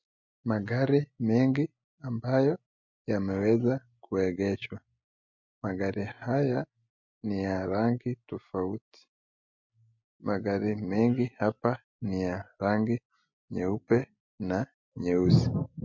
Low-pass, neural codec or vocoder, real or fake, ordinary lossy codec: 7.2 kHz; none; real; MP3, 48 kbps